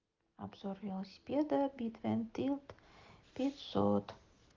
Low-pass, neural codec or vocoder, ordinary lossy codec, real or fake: 7.2 kHz; none; Opus, 32 kbps; real